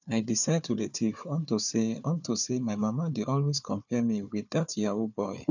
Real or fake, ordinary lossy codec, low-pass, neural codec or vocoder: fake; none; 7.2 kHz; codec, 16 kHz, 4 kbps, FunCodec, trained on Chinese and English, 50 frames a second